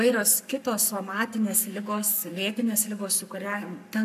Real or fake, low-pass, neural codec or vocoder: fake; 14.4 kHz; codec, 44.1 kHz, 7.8 kbps, Pupu-Codec